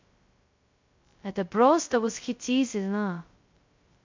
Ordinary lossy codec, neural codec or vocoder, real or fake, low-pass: MP3, 48 kbps; codec, 16 kHz, 0.2 kbps, FocalCodec; fake; 7.2 kHz